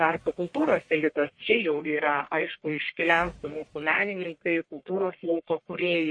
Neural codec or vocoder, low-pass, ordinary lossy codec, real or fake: codec, 44.1 kHz, 1.7 kbps, Pupu-Codec; 9.9 kHz; MP3, 48 kbps; fake